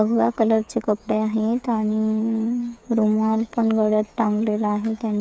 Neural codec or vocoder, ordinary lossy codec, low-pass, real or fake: codec, 16 kHz, 8 kbps, FreqCodec, smaller model; none; none; fake